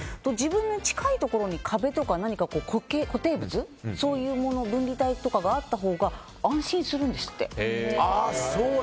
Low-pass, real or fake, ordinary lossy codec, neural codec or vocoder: none; real; none; none